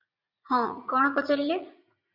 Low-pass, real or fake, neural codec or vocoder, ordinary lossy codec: 5.4 kHz; fake; vocoder, 44.1 kHz, 80 mel bands, Vocos; Opus, 64 kbps